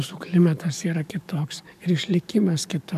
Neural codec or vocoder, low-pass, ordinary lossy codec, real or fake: codec, 44.1 kHz, 7.8 kbps, DAC; 14.4 kHz; MP3, 96 kbps; fake